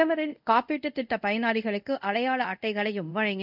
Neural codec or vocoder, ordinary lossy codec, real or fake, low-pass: codec, 24 kHz, 0.5 kbps, DualCodec; none; fake; 5.4 kHz